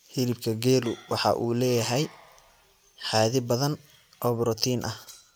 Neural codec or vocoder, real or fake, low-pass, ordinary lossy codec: none; real; none; none